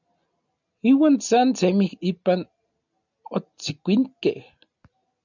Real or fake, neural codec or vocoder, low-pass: real; none; 7.2 kHz